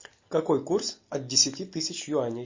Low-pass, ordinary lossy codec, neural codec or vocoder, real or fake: 7.2 kHz; MP3, 32 kbps; none; real